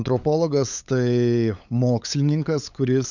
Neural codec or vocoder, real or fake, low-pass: codec, 16 kHz, 8 kbps, FunCodec, trained on LibriTTS, 25 frames a second; fake; 7.2 kHz